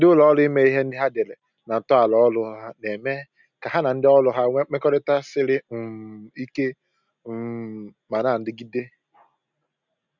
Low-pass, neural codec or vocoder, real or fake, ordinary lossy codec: 7.2 kHz; none; real; none